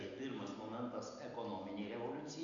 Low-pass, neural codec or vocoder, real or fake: 7.2 kHz; none; real